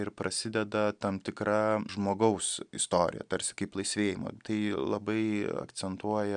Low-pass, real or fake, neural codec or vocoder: 9.9 kHz; real; none